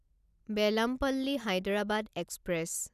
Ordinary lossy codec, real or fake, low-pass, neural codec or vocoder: none; real; 14.4 kHz; none